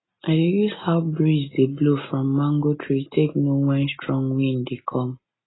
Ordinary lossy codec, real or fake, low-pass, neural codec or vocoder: AAC, 16 kbps; real; 7.2 kHz; none